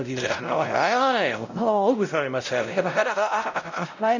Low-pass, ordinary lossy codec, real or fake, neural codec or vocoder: 7.2 kHz; none; fake; codec, 16 kHz, 0.5 kbps, X-Codec, WavLM features, trained on Multilingual LibriSpeech